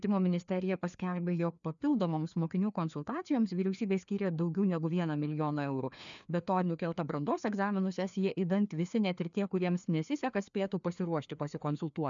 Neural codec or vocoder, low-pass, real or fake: codec, 16 kHz, 2 kbps, FreqCodec, larger model; 7.2 kHz; fake